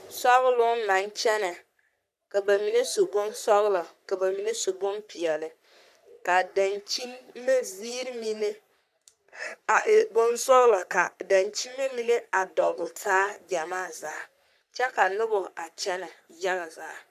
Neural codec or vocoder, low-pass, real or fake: codec, 44.1 kHz, 3.4 kbps, Pupu-Codec; 14.4 kHz; fake